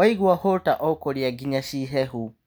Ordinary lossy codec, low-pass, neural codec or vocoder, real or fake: none; none; none; real